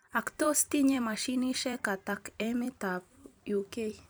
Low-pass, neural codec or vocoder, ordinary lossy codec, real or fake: none; vocoder, 44.1 kHz, 128 mel bands every 256 samples, BigVGAN v2; none; fake